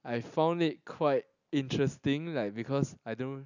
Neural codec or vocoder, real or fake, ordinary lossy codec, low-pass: none; real; none; 7.2 kHz